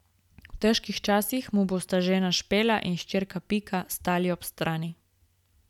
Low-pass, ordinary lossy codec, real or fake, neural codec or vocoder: 19.8 kHz; none; real; none